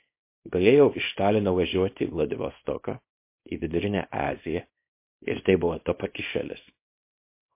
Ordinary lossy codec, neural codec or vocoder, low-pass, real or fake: MP3, 24 kbps; codec, 24 kHz, 0.9 kbps, WavTokenizer, small release; 3.6 kHz; fake